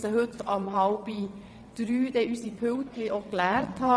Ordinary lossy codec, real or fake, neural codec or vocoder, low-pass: none; fake; vocoder, 22.05 kHz, 80 mel bands, WaveNeXt; none